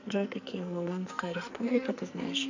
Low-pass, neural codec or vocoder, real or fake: 7.2 kHz; codec, 44.1 kHz, 2.6 kbps, SNAC; fake